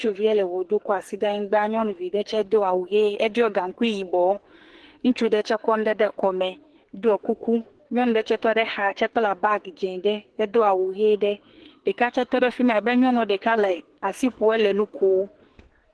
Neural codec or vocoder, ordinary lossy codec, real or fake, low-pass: codec, 44.1 kHz, 2.6 kbps, SNAC; Opus, 16 kbps; fake; 10.8 kHz